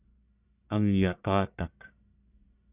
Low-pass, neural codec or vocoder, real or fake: 3.6 kHz; codec, 44.1 kHz, 1.7 kbps, Pupu-Codec; fake